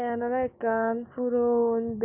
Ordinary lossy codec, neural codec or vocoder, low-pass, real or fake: none; codec, 16 kHz, 6 kbps, DAC; 3.6 kHz; fake